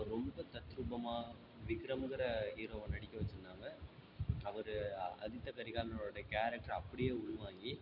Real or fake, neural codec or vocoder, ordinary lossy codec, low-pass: real; none; none; 5.4 kHz